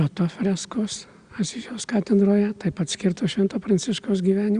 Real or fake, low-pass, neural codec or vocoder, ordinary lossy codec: real; 9.9 kHz; none; Opus, 64 kbps